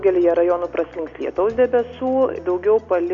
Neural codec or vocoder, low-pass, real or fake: none; 7.2 kHz; real